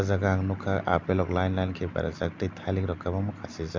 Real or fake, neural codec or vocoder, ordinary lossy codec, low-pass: real; none; MP3, 64 kbps; 7.2 kHz